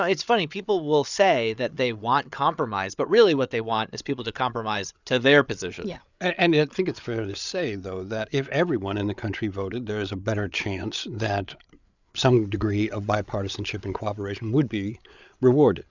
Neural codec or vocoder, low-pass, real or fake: codec, 16 kHz, 8 kbps, FreqCodec, larger model; 7.2 kHz; fake